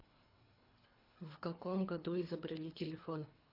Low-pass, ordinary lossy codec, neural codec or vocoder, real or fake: 5.4 kHz; AAC, 32 kbps; codec, 24 kHz, 3 kbps, HILCodec; fake